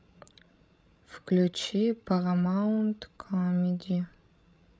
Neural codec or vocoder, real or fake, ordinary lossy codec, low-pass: codec, 16 kHz, 16 kbps, FreqCodec, larger model; fake; none; none